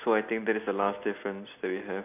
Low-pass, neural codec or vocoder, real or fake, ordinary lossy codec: 3.6 kHz; none; real; none